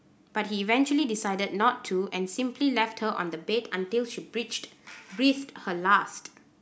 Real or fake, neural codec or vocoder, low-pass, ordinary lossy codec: real; none; none; none